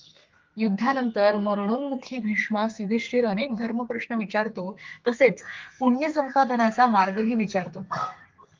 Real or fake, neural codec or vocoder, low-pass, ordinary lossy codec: fake; codec, 32 kHz, 1.9 kbps, SNAC; 7.2 kHz; Opus, 24 kbps